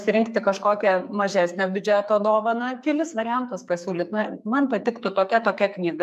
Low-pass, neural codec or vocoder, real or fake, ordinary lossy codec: 14.4 kHz; codec, 44.1 kHz, 2.6 kbps, SNAC; fake; MP3, 96 kbps